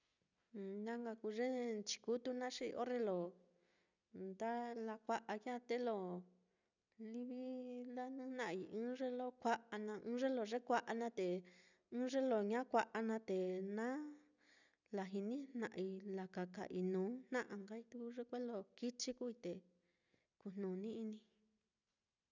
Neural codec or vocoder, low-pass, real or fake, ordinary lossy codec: none; 7.2 kHz; real; none